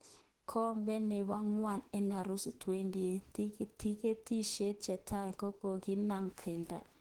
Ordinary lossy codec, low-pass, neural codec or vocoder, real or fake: Opus, 16 kbps; 19.8 kHz; autoencoder, 48 kHz, 32 numbers a frame, DAC-VAE, trained on Japanese speech; fake